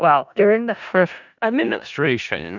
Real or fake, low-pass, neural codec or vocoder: fake; 7.2 kHz; codec, 16 kHz in and 24 kHz out, 0.4 kbps, LongCat-Audio-Codec, four codebook decoder